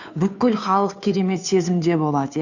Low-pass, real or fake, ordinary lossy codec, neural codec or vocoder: 7.2 kHz; fake; none; codec, 16 kHz, 2 kbps, FunCodec, trained on Chinese and English, 25 frames a second